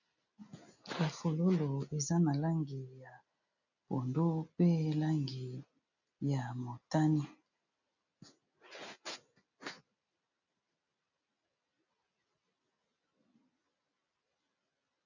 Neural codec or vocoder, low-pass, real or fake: none; 7.2 kHz; real